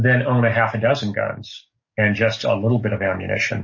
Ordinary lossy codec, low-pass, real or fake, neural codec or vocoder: MP3, 32 kbps; 7.2 kHz; fake; codec, 44.1 kHz, 7.8 kbps, DAC